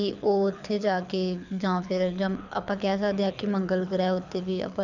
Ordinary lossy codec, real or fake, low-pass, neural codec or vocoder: none; fake; 7.2 kHz; codec, 24 kHz, 6 kbps, HILCodec